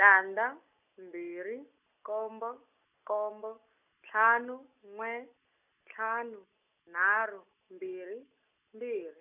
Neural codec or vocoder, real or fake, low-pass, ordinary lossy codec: none; real; 3.6 kHz; none